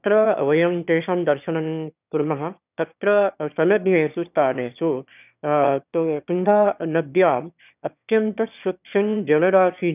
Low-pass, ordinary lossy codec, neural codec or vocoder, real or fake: 3.6 kHz; none; autoencoder, 22.05 kHz, a latent of 192 numbers a frame, VITS, trained on one speaker; fake